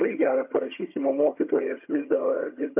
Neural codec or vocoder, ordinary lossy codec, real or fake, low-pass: vocoder, 22.05 kHz, 80 mel bands, HiFi-GAN; MP3, 32 kbps; fake; 3.6 kHz